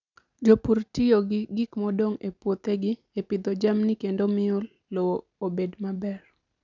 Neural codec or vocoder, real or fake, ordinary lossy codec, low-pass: none; real; none; 7.2 kHz